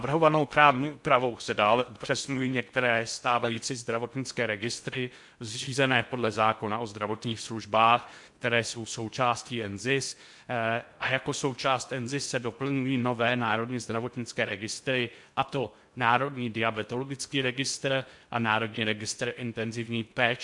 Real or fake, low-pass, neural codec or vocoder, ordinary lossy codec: fake; 10.8 kHz; codec, 16 kHz in and 24 kHz out, 0.6 kbps, FocalCodec, streaming, 2048 codes; MP3, 64 kbps